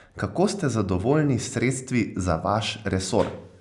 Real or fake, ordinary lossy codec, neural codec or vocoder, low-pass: real; none; none; 10.8 kHz